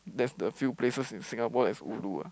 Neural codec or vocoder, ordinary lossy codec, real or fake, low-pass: none; none; real; none